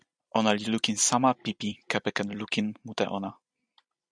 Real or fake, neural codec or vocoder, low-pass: fake; vocoder, 44.1 kHz, 128 mel bands every 256 samples, BigVGAN v2; 9.9 kHz